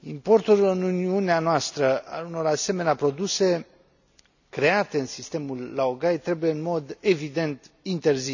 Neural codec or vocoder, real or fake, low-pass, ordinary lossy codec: none; real; 7.2 kHz; none